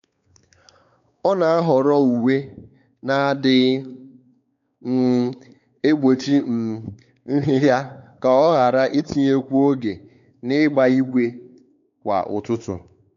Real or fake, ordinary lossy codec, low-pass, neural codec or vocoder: fake; none; 7.2 kHz; codec, 16 kHz, 4 kbps, X-Codec, WavLM features, trained on Multilingual LibriSpeech